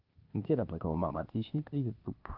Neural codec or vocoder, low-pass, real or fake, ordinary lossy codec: codec, 16 kHz, 0.8 kbps, ZipCodec; 5.4 kHz; fake; Opus, 32 kbps